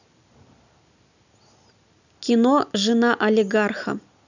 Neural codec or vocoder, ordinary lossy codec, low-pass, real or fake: none; none; 7.2 kHz; real